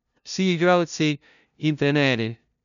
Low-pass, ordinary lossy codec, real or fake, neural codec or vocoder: 7.2 kHz; none; fake; codec, 16 kHz, 0.5 kbps, FunCodec, trained on LibriTTS, 25 frames a second